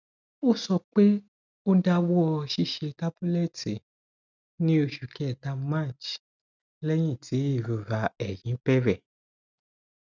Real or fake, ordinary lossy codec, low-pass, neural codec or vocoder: real; none; 7.2 kHz; none